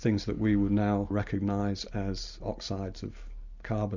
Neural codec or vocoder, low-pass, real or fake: none; 7.2 kHz; real